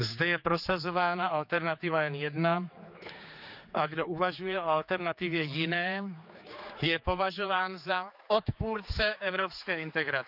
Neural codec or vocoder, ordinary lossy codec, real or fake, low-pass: codec, 16 kHz, 4 kbps, X-Codec, HuBERT features, trained on general audio; none; fake; 5.4 kHz